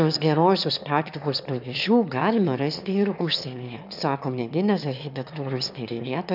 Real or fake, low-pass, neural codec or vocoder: fake; 5.4 kHz; autoencoder, 22.05 kHz, a latent of 192 numbers a frame, VITS, trained on one speaker